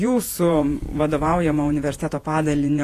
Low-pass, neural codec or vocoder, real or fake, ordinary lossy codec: 14.4 kHz; vocoder, 48 kHz, 128 mel bands, Vocos; fake; AAC, 48 kbps